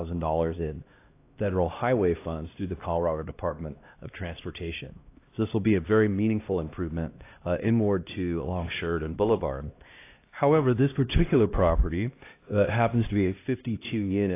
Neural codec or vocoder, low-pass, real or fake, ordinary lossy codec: codec, 16 kHz, 1 kbps, X-Codec, HuBERT features, trained on LibriSpeech; 3.6 kHz; fake; AAC, 24 kbps